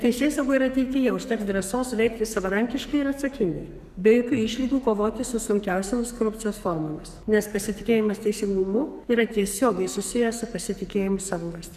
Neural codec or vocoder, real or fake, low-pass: codec, 44.1 kHz, 2.6 kbps, SNAC; fake; 14.4 kHz